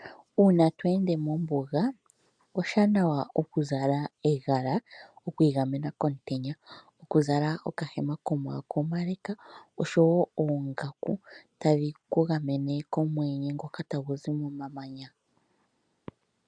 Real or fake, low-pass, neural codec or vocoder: real; 9.9 kHz; none